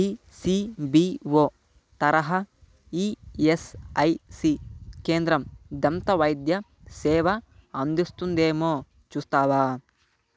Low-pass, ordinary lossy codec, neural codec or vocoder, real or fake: none; none; none; real